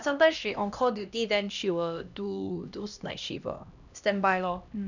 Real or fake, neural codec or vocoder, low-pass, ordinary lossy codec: fake; codec, 16 kHz, 1 kbps, X-Codec, HuBERT features, trained on LibriSpeech; 7.2 kHz; none